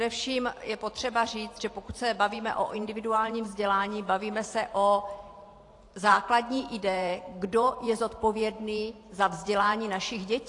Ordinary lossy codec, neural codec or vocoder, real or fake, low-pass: AAC, 48 kbps; vocoder, 44.1 kHz, 128 mel bands every 512 samples, BigVGAN v2; fake; 10.8 kHz